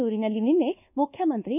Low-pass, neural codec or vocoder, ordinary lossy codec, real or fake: 3.6 kHz; codec, 24 kHz, 1.2 kbps, DualCodec; none; fake